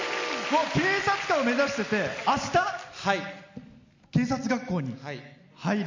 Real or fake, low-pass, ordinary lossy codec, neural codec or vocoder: real; 7.2 kHz; MP3, 64 kbps; none